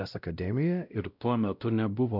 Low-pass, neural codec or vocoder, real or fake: 5.4 kHz; codec, 16 kHz, 0.5 kbps, X-Codec, WavLM features, trained on Multilingual LibriSpeech; fake